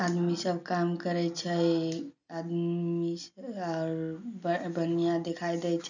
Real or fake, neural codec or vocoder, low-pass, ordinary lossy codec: real; none; 7.2 kHz; none